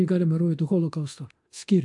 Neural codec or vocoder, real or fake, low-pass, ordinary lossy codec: codec, 24 kHz, 0.9 kbps, DualCodec; fake; 10.8 kHz; AAC, 64 kbps